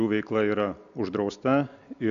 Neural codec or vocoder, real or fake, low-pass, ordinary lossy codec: none; real; 7.2 kHz; MP3, 96 kbps